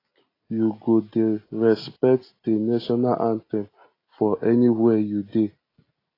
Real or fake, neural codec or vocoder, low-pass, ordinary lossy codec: real; none; 5.4 kHz; AAC, 24 kbps